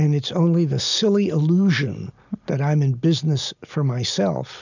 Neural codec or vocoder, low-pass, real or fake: none; 7.2 kHz; real